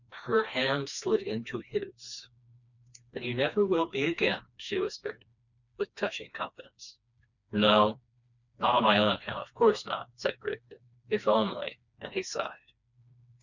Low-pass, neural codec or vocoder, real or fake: 7.2 kHz; codec, 16 kHz, 2 kbps, FreqCodec, smaller model; fake